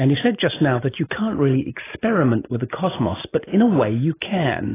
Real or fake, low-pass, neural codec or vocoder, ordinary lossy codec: real; 3.6 kHz; none; AAC, 16 kbps